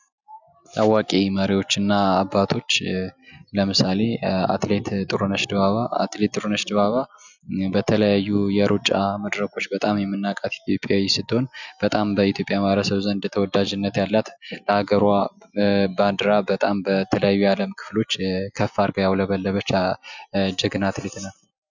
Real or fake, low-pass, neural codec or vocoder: real; 7.2 kHz; none